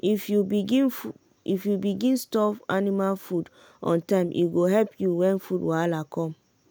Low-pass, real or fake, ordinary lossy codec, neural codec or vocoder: none; real; none; none